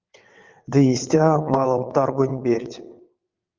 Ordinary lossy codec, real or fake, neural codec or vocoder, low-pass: Opus, 24 kbps; fake; vocoder, 22.05 kHz, 80 mel bands, WaveNeXt; 7.2 kHz